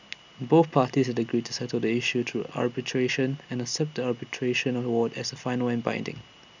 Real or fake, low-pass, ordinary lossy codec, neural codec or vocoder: real; 7.2 kHz; none; none